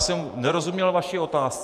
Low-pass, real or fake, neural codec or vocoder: 14.4 kHz; fake; vocoder, 48 kHz, 128 mel bands, Vocos